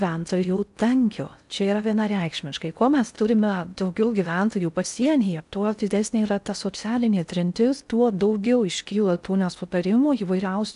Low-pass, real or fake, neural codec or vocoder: 10.8 kHz; fake; codec, 16 kHz in and 24 kHz out, 0.6 kbps, FocalCodec, streaming, 4096 codes